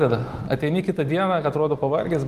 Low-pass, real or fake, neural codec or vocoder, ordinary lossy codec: 14.4 kHz; fake; vocoder, 44.1 kHz, 128 mel bands every 256 samples, BigVGAN v2; Opus, 24 kbps